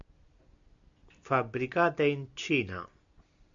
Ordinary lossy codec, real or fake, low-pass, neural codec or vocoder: AAC, 64 kbps; real; 7.2 kHz; none